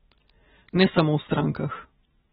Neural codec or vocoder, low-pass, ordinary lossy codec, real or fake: none; 19.8 kHz; AAC, 16 kbps; real